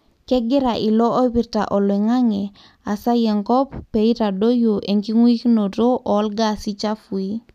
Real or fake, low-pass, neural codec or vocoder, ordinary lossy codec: real; 14.4 kHz; none; none